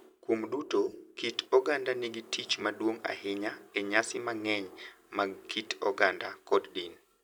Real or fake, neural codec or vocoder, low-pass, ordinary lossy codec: real; none; none; none